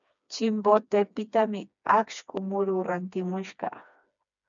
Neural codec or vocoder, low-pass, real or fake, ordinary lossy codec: codec, 16 kHz, 2 kbps, FreqCodec, smaller model; 7.2 kHz; fake; MP3, 96 kbps